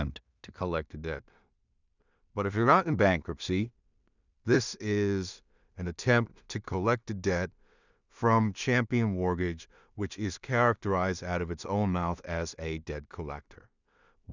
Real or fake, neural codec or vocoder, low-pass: fake; codec, 16 kHz in and 24 kHz out, 0.4 kbps, LongCat-Audio-Codec, two codebook decoder; 7.2 kHz